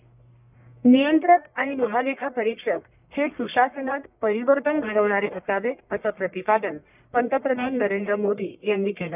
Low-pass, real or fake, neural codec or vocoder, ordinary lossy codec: 3.6 kHz; fake; codec, 44.1 kHz, 1.7 kbps, Pupu-Codec; none